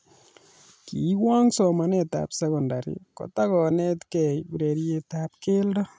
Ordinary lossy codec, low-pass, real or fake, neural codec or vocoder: none; none; real; none